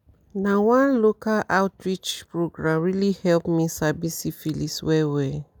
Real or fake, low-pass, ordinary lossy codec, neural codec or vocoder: real; none; none; none